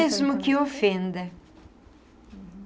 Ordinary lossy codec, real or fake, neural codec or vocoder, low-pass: none; real; none; none